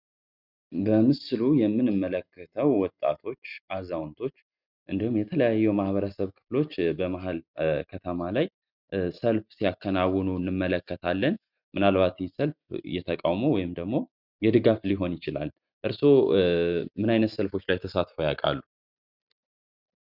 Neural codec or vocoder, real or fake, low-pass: none; real; 5.4 kHz